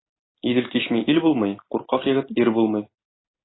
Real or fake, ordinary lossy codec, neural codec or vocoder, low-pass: real; AAC, 16 kbps; none; 7.2 kHz